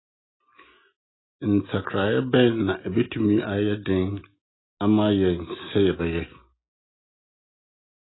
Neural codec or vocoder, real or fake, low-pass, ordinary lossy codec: none; real; 7.2 kHz; AAC, 16 kbps